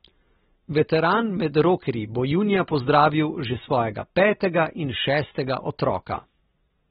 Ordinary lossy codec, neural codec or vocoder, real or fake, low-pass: AAC, 16 kbps; none; real; 19.8 kHz